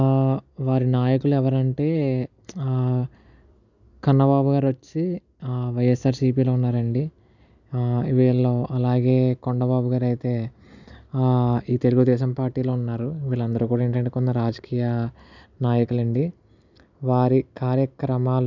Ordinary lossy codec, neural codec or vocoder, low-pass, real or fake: none; none; 7.2 kHz; real